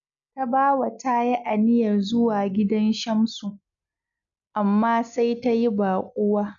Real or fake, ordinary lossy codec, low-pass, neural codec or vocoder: real; none; 7.2 kHz; none